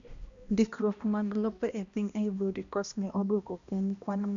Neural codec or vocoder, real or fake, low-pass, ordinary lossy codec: codec, 16 kHz, 1 kbps, X-Codec, HuBERT features, trained on balanced general audio; fake; 7.2 kHz; Opus, 64 kbps